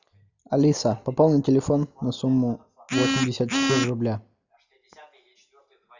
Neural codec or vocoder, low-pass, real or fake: none; 7.2 kHz; real